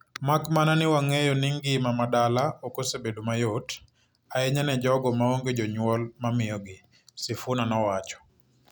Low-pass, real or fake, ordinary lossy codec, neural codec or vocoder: none; real; none; none